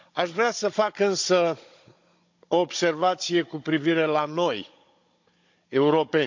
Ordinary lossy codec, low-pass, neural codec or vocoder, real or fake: MP3, 48 kbps; 7.2 kHz; codec, 16 kHz, 16 kbps, FunCodec, trained on Chinese and English, 50 frames a second; fake